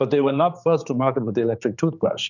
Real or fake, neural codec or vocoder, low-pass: fake; codec, 16 kHz, 4 kbps, X-Codec, HuBERT features, trained on general audio; 7.2 kHz